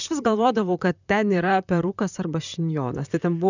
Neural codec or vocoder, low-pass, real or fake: vocoder, 44.1 kHz, 128 mel bands, Pupu-Vocoder; 7.2 kHz; fake